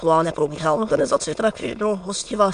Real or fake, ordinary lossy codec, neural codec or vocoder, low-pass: fake; MP3, 64 kbps; autoencoder, 22.05 kHz, a latent of 192 numbers a frame, VITS, trained on many speakers; 9.9 kHz